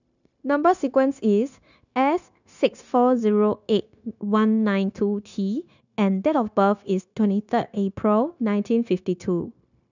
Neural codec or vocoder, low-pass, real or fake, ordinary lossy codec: codec, 16 kHz, 0.9 kbps, LongCat-Audio-Codec; 7.2 kHz; fake; none